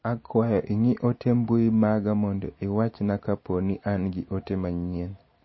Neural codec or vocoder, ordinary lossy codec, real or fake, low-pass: none; MP3, 24 kbps; real; 7.2 kHz